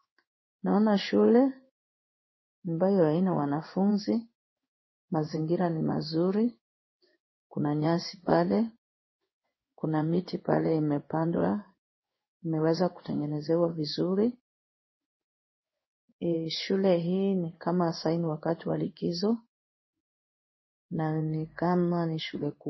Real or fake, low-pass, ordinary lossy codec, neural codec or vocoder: fake; 7.2 kHz; MP3, 24 kbps; codec, 16 kHz in and 24 kHz out, 1 kbps, XY-Tokenizer